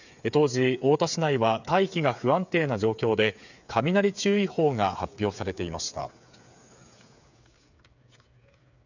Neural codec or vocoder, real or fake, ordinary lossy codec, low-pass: codec, 16 kHz, 8 kbps, FreqCodec, smaller model; fake; none; 7.2 kHz